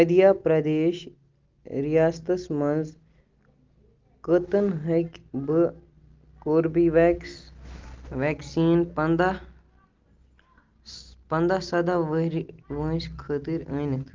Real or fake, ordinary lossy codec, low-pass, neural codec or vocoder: real; Opus, 32 kbps; 7.2 kHz; none